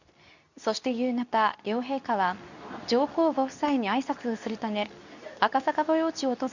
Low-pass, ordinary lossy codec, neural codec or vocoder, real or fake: 7.2 kHz; none; codec, 24 kHz, 0.9 kbps, WavTokenizer, medium speech release version 2; fake